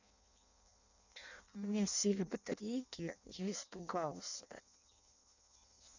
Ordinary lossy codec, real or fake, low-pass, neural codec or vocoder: none; fake; 7.2 kHz; codec, 16 kHz in and 24 kHz out, 0.6 kbps, FireRedTTS-2 codec